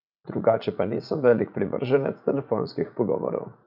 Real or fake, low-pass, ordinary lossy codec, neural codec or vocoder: fake; 5.4 kHz; none; vocoder, 44.1 kHz, 80 mel bands, Vocos